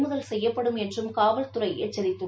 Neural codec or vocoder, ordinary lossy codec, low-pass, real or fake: none; none; none; real